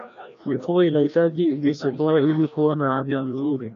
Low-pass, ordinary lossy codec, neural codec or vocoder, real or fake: 7.2 kHz; MP3, 64 kbps; codec, 16 kHz, 1 kbps, FreqCodec, larger model; fake